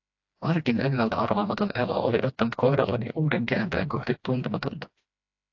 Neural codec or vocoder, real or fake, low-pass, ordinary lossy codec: codec, 16 kHz, 1 kbps, FreqCodec, smaller model; fake; 7.2 kHz; AAC, 48 kbps